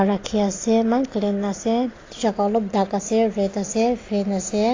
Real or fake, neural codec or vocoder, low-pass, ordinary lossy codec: real; none; 7.2 kHz; AAC, 32 kbps